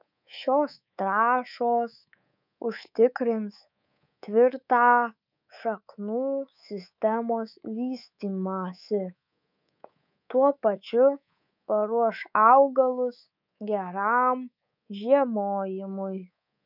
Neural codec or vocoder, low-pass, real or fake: codec, 24 kHz, 3.1 kbps, DualCodec; 5.4 kHz; fake